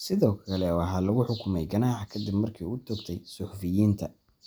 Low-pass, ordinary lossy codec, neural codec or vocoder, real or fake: none; none; none; real